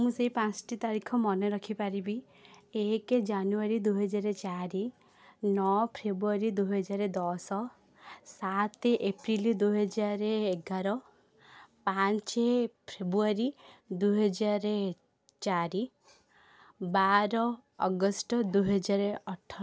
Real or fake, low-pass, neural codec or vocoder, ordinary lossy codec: real; none; none; none